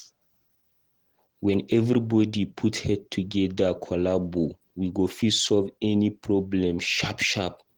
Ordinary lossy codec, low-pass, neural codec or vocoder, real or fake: Opus, 16 kbps; 19.8 kHz; none; real